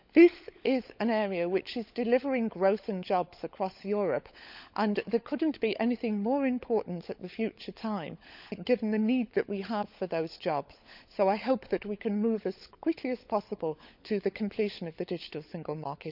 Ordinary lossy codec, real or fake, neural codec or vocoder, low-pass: none; fake; codec, 16 kHz, 16 kbps, FunCodec, trained on LibriTTS, 50 frames a second; 5.4 kHz